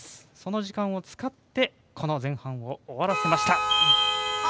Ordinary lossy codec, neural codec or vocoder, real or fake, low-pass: none; none; real; none